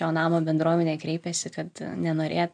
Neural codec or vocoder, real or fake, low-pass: none; real; 9.9 kHz